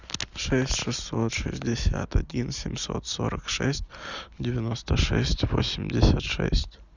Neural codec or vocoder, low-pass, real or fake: none; 7.2 kHz; real